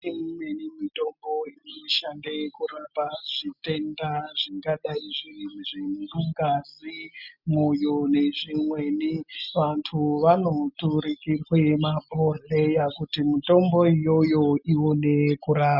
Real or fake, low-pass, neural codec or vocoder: real; 5.4 kHz; none